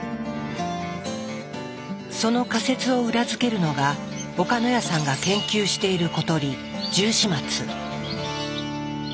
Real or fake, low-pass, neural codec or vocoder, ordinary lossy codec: real; none; none; none